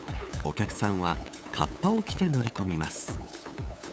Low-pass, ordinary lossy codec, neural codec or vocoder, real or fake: none; none; codec, 16 kHz, 8 kbps, FunCodec, trained on LibriTTS, 25 frames a second; fake